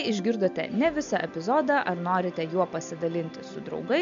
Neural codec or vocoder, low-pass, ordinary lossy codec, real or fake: none; 7.2 kHz; MP3, 64 kbps; real